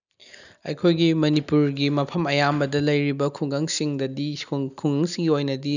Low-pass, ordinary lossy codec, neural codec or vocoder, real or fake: 7.2 kHz; none; none; real